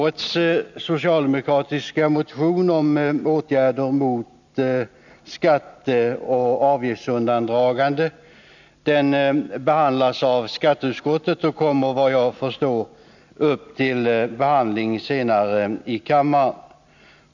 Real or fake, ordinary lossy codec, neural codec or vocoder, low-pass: real; none; none; 7.2 kHz